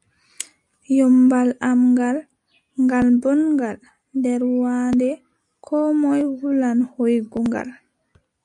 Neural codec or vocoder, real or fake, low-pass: none; real; 10.8 kHz